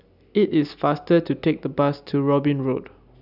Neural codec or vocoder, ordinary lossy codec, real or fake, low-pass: none; none; real; 5.4 kHz